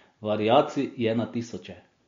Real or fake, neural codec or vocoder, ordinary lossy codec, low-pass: real; none; MP3, 48 kbps; 7.2 kHz